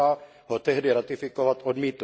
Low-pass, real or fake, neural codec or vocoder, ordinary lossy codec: none; real; none; none